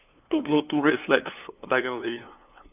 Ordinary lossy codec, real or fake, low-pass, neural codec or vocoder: none; fake; 3.6 kHz; codec, 16 kHz, 2 kbps, FunCodec, trained on LibriTTS, 25 frames a second